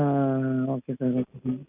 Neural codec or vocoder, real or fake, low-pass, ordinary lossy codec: none; real; 3.6 kHz; none